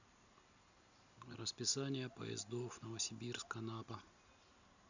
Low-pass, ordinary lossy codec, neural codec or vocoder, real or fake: 7.2 kHz; none; none; real